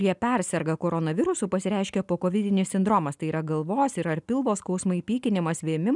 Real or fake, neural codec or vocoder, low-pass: real; none; 10.8 kHz